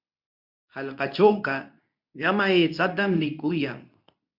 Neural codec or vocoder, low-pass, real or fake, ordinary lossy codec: codec, 24 kHz, 0.9 kbps, WavTokenizer, medium speech release version 2; 5.4 kHz; fake; MP3, 48 kbps